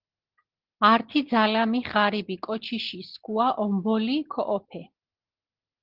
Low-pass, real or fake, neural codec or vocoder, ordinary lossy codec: 5.4 kHz; real; none; Opus, 16 kbps